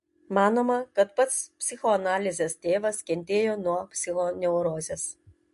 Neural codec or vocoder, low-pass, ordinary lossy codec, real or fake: none; 14.4 kHz; MP3, 48 kbps; real